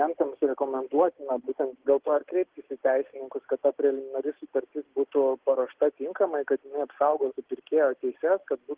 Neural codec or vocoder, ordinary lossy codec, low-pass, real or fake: none; Opus, 16 kbps; 3.6 kHz; real